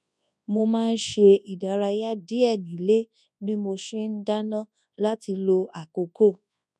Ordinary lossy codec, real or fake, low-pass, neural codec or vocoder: none; fake; none; codec, 24 kHz, 0.9 kbps, WavTokenizer, large speech release